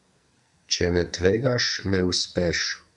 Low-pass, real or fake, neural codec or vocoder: 10.8 kHz; fake; codec, 44.1 kHz, 2.6 kbps, SNAC